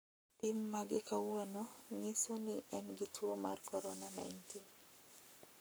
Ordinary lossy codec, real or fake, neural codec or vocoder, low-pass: none; fake; codec, 44.1 kHz, 7.8 kbps, Pupu-Codec; none